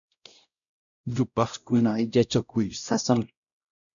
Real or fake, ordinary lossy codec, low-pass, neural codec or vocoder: fake; MP3, 96 kbps; 7.2 kHz; codec, 16 kHz, 0.5 kbps, X-Codec, WavLM features, trained on Multilingual LibriSpeech